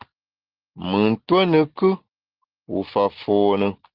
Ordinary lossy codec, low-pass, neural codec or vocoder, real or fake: Opus, 16 kbps; 5.4 kHz; none; real